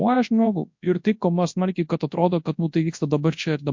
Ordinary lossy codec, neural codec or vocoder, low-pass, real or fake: MP3, 48 kbps; codec, 24 kHz, 0.9 kbps, WavTokenizer, large speech release; 7.2 kHz; fake